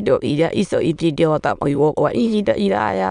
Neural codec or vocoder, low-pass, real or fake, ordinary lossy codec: autoencoder, 22.05 kHz, a latent of 192 numbers a frame, VITS, trained on many speakers; 9.9 kHz; fake; none